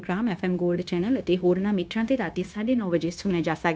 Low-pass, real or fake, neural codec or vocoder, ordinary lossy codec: none; fake; codec, 16 kHz, 0.9 kbps, LongCat-Audio-Codec; none